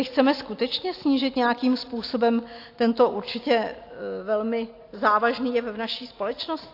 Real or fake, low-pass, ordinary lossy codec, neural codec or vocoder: real; 5.4 kHz; AAC, 32 kbps; none